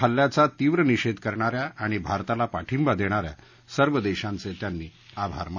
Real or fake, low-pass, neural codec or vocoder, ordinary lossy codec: real; 7.2 kHz; none; none